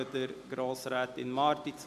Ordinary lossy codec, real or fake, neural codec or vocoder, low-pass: none; real; none; 14.4 kHz